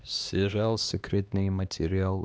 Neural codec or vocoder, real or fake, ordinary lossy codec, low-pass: codec, 16 kHz, 2 kbps, X-Codec, HuBERT features, trained on LibriSpeech; fake; none; none